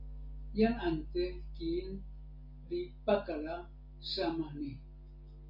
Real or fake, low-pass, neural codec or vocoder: real; 5.4 kHz; none